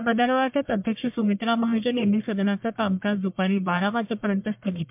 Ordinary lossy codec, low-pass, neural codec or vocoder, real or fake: MP3, 32 kbps; 3.6 kHz; codec, 44.1 kHz, 1.7 kbps, Pupu-Codec; fake